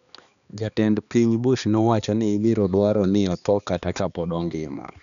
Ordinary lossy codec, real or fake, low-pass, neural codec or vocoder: none; fake; 7.2 kHz; codec, 16 kHz, 2 kbps, X-Codec, HuBERT features, trained on balanced general audio